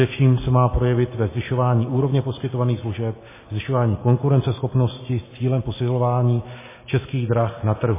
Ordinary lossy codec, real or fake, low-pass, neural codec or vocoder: MP3, 16 kbps; real; 3.6 kHz; none